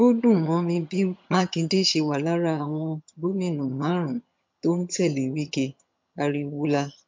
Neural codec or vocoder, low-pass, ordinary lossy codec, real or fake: vocoder, 22.05 kHz, 80 mel bands, HiFi-GAN; 7.2 kHz; MP3, 48 kbps; fake